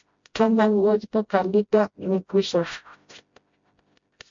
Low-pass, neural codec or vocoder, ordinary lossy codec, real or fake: 7.2 kHz; codec, 16 kHz, 0.5 kbps, FreqCodec, smaller model; MP3, 48 kbps; fake